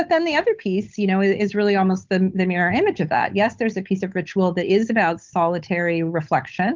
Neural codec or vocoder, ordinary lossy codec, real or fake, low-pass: codec, 16 kHz, 8 kbps, FunCodec, trained on Chinese and English, 25 frames a second; Opus, 24 kbps; fake; 7.2 kHz